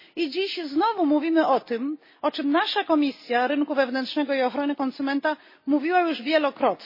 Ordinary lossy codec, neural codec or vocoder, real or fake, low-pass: MP3, 24 kbps; none; real; 5.4 kHz